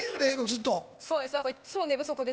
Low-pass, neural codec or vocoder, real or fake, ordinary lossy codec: none; codec, 16 kHz, 0.8 kbps, ZipCodec; fake; none